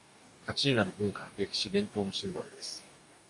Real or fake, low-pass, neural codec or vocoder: fake; 10.8 kHz; codec, 44.1 kHz, 2.6 kbps, DAC